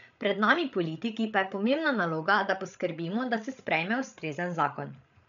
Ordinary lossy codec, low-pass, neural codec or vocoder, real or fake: none; 7.2 kHz; codec, 16 kHz, 8 kbps, FreqCodec, larger model; fake